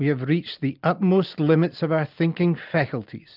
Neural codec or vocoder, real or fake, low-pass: none; real; 5.4 kHz